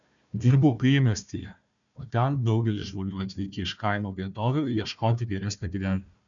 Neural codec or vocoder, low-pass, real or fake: codec, 16 kHz, 1 kbps, FunCodec, trained on Chinese and English, 50 frames a second; 7.2 kHz; fake